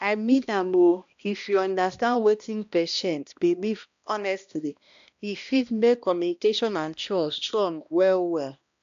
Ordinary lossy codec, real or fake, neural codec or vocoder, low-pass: AAC, 64 kbps; fake; codec, 16 kHz, 1 kbps, X-Codec, HuBERT features, trained on balanced general audio; 7.2 kHz